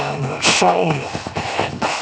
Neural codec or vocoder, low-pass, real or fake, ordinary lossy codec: codec, 16 kHz, 0.7 kbps, FocalCodec; none; fake; none